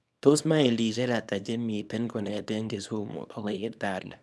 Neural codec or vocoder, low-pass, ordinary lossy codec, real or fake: codec, 24 kHz, 0.9 kbps, WavTokenizer, small release; none; none; fake